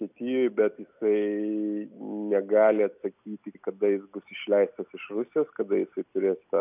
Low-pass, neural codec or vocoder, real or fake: 3.6 kHz; none; real